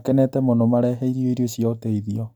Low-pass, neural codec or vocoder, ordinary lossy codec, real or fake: none; none; none; real